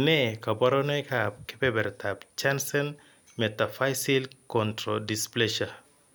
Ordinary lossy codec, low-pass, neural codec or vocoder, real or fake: none; none; none; real